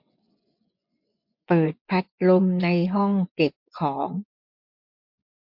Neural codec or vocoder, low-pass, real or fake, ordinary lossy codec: vocoder, 24 kHz, 100 mel bands, Vocos; 5.4 kHz; fake; MP3, 48 kbps